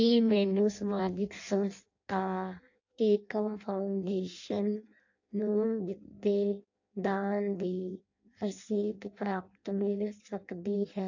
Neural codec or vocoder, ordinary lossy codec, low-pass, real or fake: codec, 16 kHz in and 24 kHz out, 0.6 kbps, FireRedTTS-2 codec; AAC, 48 kbps; 7.2 kHz; fake